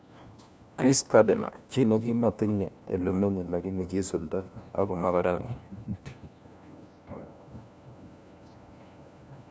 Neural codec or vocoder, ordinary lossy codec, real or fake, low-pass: codec, 16 kHz, 1 kbps, FunCodec, trained on LibriTTS, 50 frames a second; none; fake; none